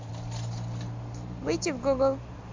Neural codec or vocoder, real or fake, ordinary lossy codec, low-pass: none; real; MP3, 48 kbps; 7.2 kHz